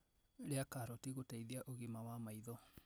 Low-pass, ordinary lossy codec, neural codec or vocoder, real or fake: none; none; none; real